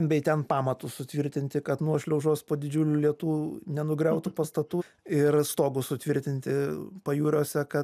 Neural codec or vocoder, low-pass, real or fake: none; 14.4 kHz; real